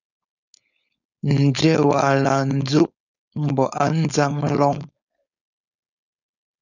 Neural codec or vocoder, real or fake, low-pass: codec, 16 kHz, 4.8 kbps, FACodec; fake; 7.2 kHz